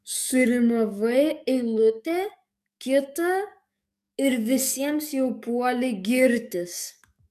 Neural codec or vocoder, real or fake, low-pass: codec, 44.1 kHz, 7.8 kbps, DAC; fake; 14.4 kHz